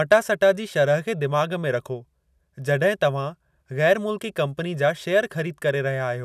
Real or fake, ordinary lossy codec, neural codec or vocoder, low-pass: real; none; none; 14.4 kHz